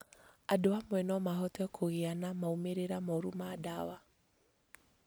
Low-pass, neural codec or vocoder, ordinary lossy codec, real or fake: none; none; none; real